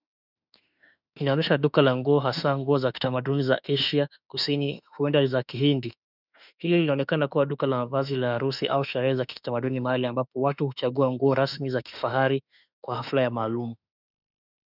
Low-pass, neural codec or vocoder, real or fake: 5.4 kHz; autoencoder, 48 kHz, 32 numbers a frame, DAC-VAE, trained on Japanese speech; fake